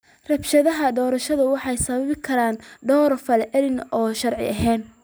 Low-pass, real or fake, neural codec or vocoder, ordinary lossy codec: none; real; none; none